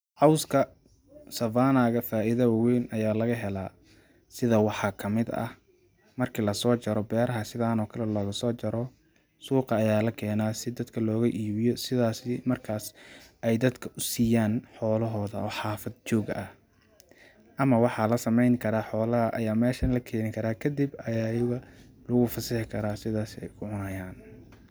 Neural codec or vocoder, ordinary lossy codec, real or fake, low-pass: none; none; real; none